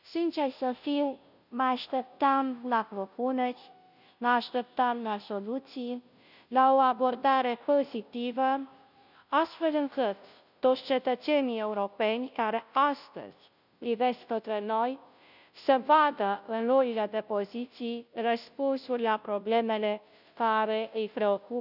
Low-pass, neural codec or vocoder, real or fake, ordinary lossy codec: 5.4 kHz; codec, 16 kHz, 0.5 kbps, FunCodec, trained on Chinese and English, 25 frames a second; fake; none